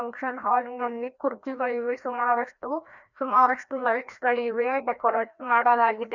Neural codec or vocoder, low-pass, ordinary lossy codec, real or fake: codec, 16 kHz, 1 kbps, FreqCodec, larger model; 7.2 kHz; none; fake